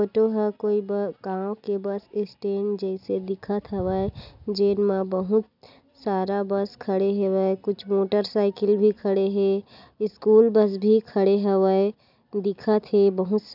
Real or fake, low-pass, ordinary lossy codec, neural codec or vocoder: real; 5.4 kHz; none; none